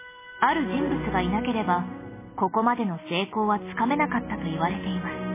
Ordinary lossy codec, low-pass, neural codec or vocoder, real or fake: MP3, 16 kbps; 3.6 kHz; none; real